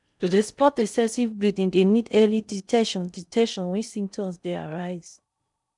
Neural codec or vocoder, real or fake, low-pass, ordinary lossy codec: codec, 16 kHz in and 24 kHz out, 0.6 kbps, FocalCodec, streaming, 4096 codes; fake; 10.8 kHz; none